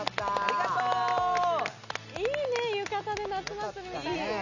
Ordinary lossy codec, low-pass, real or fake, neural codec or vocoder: none; 7.2 kHz; real; none